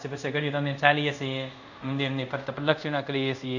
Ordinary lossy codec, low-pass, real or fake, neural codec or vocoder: none; 7.2 kHz; fake; codec, 24 kHz, 0.5 kbps, DualCodec